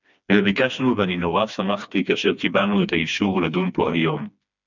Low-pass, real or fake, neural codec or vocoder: 7.2 kHz; fake; codec, 16 kHz, 2 kbps, FreqCodec, smaller model